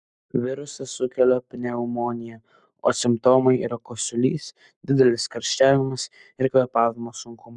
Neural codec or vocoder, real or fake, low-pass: codec, 44.1 kHz, 7.8 kbps, Pupu-Codec; fake; 10.8 kHz